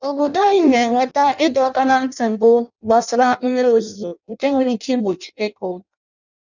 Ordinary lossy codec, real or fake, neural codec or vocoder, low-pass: none; fake; codec, 16 kHz in and 24 kHz out, 0.6 kbps, FireRedTTS-2 codec; 7.2 kHz